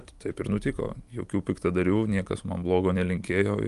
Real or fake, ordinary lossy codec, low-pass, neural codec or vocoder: real; Opus, 32 kbps; 10.8 kHz; none